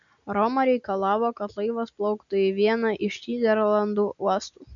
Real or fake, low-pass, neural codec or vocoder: real; 7.2 kHz; none